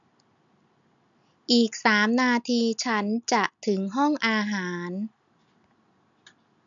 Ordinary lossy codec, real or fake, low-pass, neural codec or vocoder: none; real; 7.2 kHz; none